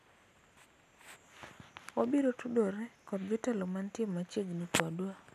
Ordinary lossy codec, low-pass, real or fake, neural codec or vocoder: none; 14.4 kHz; real; none